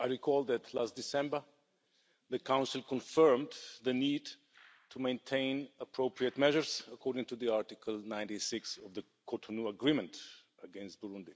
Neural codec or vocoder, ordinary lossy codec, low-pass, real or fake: none; none; none; real